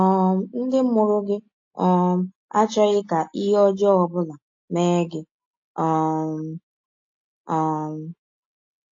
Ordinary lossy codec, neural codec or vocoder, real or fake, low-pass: AAC, 48 kbps; none; real; 7.2 kHz